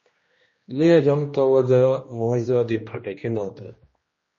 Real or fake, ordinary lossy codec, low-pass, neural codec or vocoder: fake; MP3, 32 kbps; 7.2 kHz; codec, 16 kHz, 1 kbps, X-Codec, HuBERT features, trained on general audio